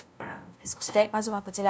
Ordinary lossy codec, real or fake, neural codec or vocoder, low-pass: none; fake; codec, 16 kHz, 0.5 kbps, FunCodec, trained on LibriTTS, 25 frames a second; none